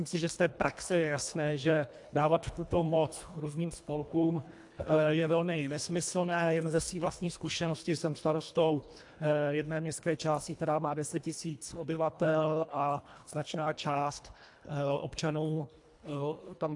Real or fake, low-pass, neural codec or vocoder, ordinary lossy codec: fake; 10.8 kHz; codec, 24 kHz, 1.5 kbps, HILCodec; AAC, 64 kbps